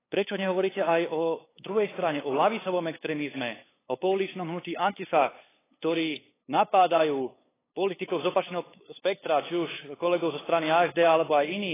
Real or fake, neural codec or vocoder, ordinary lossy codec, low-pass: fake; codec, 16 kHz, 8 kbps, FunCodec, trained on LibriTTS, 25 frames a second; AAC, 16 kbps; 3.6 kHz